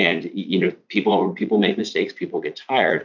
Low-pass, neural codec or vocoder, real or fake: 7.2 kHz; vocoder, 44.1 kHz, 80 mel bands, Vocos; fake